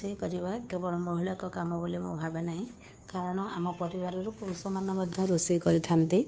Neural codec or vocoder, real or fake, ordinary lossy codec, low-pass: codec, 16 kHz, 2 kbps, FunCodec, trained on Chinese and English, 25 frames a second; fake; none; none